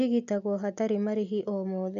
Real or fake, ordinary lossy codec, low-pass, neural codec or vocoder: real; none; 7.2 kHz; none